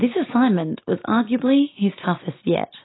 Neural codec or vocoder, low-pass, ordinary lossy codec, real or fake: none; 7.2 kHz; AAC, 16 kbps; real